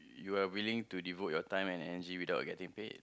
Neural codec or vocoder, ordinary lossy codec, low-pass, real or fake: none; none; none; real